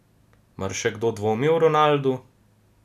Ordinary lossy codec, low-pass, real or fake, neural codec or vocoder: none; 14.4 kHz; real; none